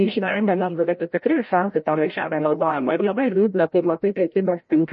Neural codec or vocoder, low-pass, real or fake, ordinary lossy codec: codec, 16 kHz, 0.5 kbps, FreqCodec, larger model; 7.2 kHz; fake; MP3, 32 kbps